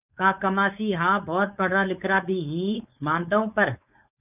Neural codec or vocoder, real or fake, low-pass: codec, 16 kHz, 4.8 kbps, FACodec; fake; 3.6 kHz